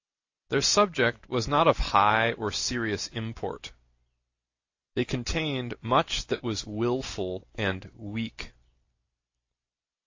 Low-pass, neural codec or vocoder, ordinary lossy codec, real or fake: 7.2 kHz; none; AAC, 48 kbps; real